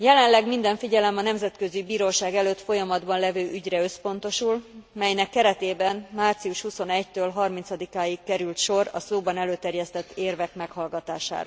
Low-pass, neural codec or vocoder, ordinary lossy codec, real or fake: none; none; none; real